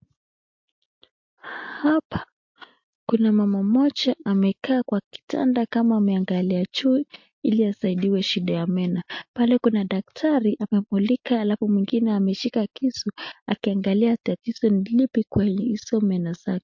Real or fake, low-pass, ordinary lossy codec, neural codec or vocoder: real; 7.2 kHz; MP3, 48 kbps; none